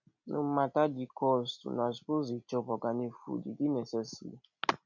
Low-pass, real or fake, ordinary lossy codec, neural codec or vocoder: 7.2 kHz; real; none; none